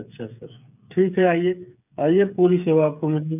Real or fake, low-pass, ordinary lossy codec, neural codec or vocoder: fake; 3.6 kHz; none; codec, 16 kHz, 8 kbps, FreqCodec, smaller model